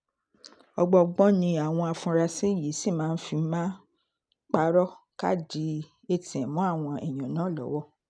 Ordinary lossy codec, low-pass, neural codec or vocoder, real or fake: none; 9.9 kHz; none; real